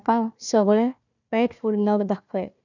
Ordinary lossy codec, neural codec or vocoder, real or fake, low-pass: none; codec, 16 kHz, 1 kbps, FunCodec, trained on Chinese and English, 50 frames a second; fake; 7.2 kHz